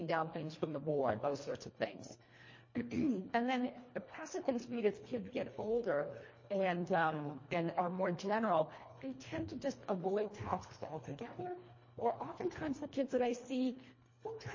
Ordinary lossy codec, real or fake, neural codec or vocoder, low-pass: MP3, 32 kbps; fake; codec, 24 kHz, 1.5 kbps, HILCodec; 7.2 kHz